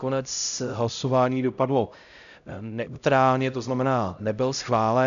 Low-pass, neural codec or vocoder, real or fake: 7.2 kHz; codec, 16 kHz, 0.5 kbps, X-Codec, HuBERT features, trained on LibriSpeech; fake